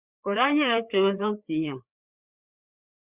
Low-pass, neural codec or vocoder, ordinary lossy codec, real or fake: 3.6 kHz; codec, 16 kHz, 4 kbps, FreqCodec, larger model; Opus, 64 kbps; fake